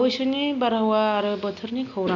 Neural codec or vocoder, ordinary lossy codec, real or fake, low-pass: none; none; real; 7.2 kHz